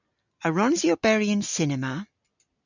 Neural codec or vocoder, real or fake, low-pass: none; real; 7.2 kHz